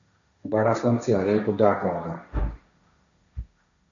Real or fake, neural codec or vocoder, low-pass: fake; codec, 16 kHz, 1.1 kbps, Voila-Tokenizer; 7.2 kHz